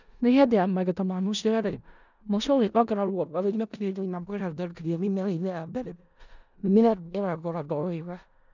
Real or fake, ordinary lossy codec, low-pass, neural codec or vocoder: fake; none; 7.2 kHz; codec, 16 kHz in and 24 kHz out, 0.4 kbps, LongCat-Audio-Codec, four codebook decoder